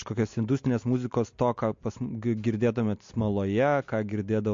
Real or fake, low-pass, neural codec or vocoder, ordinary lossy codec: real; 7.2 kHz; none; MP3, 48 kbps